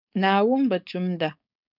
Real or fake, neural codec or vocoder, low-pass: fake; codec, 16 kHz, 4.8 kbps, FACodec; 5.4 kHz